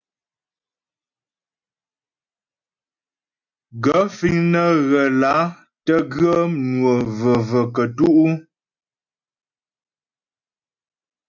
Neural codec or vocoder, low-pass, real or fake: none; 7.2 kHz; real